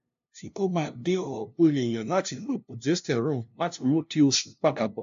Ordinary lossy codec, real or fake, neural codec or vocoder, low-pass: AAC, 64 kbps; fake; codec, 16 kHz, 0.5 kbps, FunCodec, trained on LibriTTS, 25 frames a second; 7.2 kHz